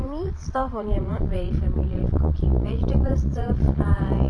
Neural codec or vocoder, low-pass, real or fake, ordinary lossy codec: vocoder, 22.05 kHz, 80 mel bands, Vocos; none; fake; none